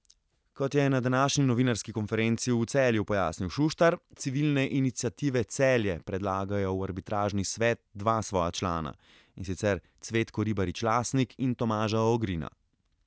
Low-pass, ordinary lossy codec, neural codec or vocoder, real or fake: none; none; none; real